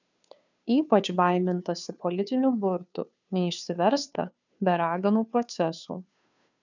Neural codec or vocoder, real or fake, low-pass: codec, 16 kHz, 2 kbps, FunCodec, trained on Chinese and English, 25 frames a second; fake; 7.2 kHz